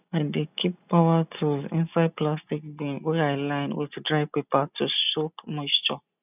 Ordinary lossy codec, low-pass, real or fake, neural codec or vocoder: none; 3.6 kHz; real; none